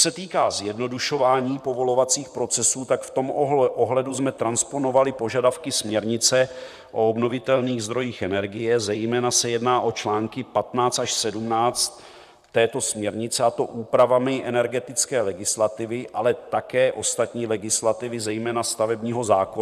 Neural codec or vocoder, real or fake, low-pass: vocoder, 44.1 kHz, 128 mel bands, Pupu-Vocoder; fake; 14.4 kHz